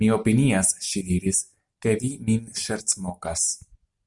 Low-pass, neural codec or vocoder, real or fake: 10.8 kHz; none; real